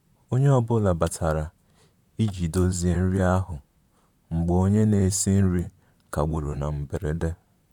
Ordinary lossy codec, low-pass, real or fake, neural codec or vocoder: none; 19.8 kHz; fake; vocoder, 44.1 kHz, 128 mel bands, Pupu-Vocoder